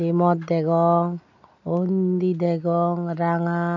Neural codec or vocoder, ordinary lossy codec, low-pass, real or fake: none; none; 7.2 kHz; real